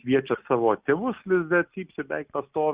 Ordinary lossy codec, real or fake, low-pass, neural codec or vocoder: Opus, 64 kbps; real; 3.6 kHz; none